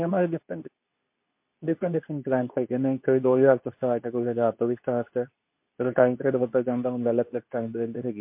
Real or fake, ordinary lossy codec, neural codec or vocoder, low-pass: fake; MP3, 32 kbps; codec, 24 kHz, 0.9 kbps, WavTokenizer, medium speech release version 2; 3.6 kHz